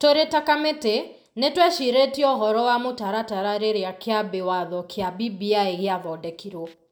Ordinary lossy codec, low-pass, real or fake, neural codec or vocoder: none; none; real; none